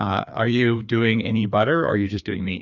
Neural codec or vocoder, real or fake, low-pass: codec, 16 kHz, 2 kbps, FreqCodec, larger model; fake; 7.2 kHz